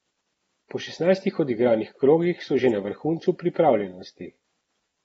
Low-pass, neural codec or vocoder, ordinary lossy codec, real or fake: 19.8 kHz; none; AAC, 24 kbps; real